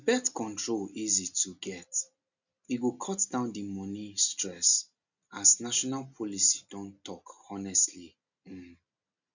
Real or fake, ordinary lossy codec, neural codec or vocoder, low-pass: real; none; none; 7.2 kHz